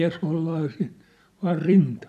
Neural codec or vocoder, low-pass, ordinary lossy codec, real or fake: vocoder, 44.1 kHz, 128 mel bands every 256 samples, BigVGAN v2; 14.4 kHz; none; fake